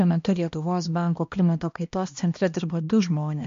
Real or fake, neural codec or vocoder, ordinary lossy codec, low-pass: fake; codec, 16 kHz, 1 kbps, X-Codec, HuBERT features, trained on balanced general audio; MP3, 48 kbps; 7.2 kHz